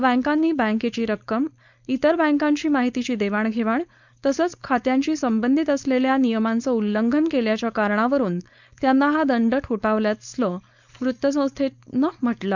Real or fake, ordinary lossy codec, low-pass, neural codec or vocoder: fake; none; 7.2 kHz; codec, 16 kHz, 4.8 kbps, FACodec